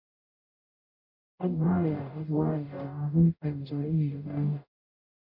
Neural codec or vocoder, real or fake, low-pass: codec, 44.1 kHz, 0.9 kbps, DAC; fake; 5.4 kHz